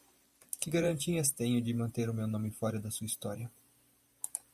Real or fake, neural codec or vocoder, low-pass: fake; vocoder, 44.1 kHz, 128 mel bands every 512 samples, BigVGAN v2; 14.4 kHz